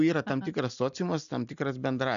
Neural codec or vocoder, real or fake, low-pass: none; real; 7.2 kHz